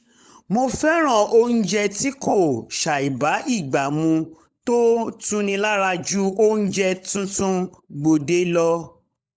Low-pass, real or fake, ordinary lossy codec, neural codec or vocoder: none; fake; none; codec, 16 kHz, 16 kbps, FunCodec, trained on LibriTTS, 50 frames a second